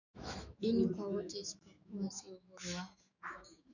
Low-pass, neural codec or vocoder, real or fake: 7.2 kHz; autoencoder, 48 kHz, 128 numbers a frame, DAC-VAE, trained on Japanese speech; fake